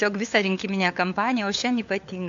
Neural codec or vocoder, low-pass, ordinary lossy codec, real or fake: codec, 16 kHz, 4 kbps, FunCodec, trained on LibriTTS, 50 frames a second; 7.2 kHz; MP3, 64 kbps; fake